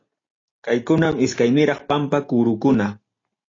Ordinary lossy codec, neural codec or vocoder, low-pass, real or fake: AAC, 32 kbps; none; 7.2 kHz; real